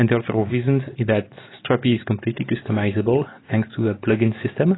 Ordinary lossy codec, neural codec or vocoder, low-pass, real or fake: AAC, 16 kbps; none; 7.2 kHz; real